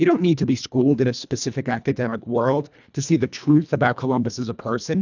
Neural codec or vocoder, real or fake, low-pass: codec, 24 kHz, 1.5 kbps, HILCodec; fake; 7.2 kHz